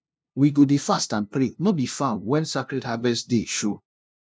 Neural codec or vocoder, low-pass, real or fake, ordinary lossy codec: codec, 16 kHz, 0.5 kbps, FunCodec, trained on LibriTTS, 25 frames a second; none; fake; none